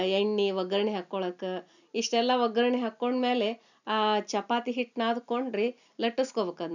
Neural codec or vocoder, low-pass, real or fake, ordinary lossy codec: none; 7.2 kHz; real; none